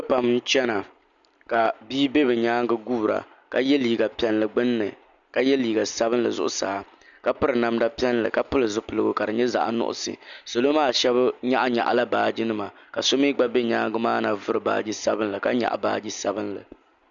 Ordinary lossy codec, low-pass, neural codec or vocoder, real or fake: MP3, 96 kbps; 7.2 kHz; none; real